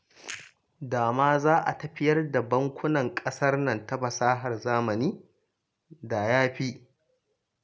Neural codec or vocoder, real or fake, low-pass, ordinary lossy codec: none; real; none; none